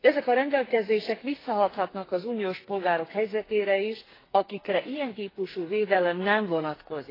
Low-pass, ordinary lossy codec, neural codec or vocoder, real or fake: 5.4 kHz; AAC, 24 kbps; codec, 44.1 kHz, 2.6 kbps, SNAC; fake